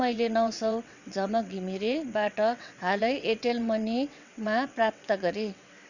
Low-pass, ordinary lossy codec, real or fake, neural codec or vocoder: 7.2 kHz; none; fake; vocoder, 22.05 kHz, 80 mel bands, WaveNeXt